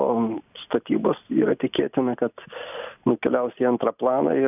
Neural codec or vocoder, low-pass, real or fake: none; 3.6 kHz; real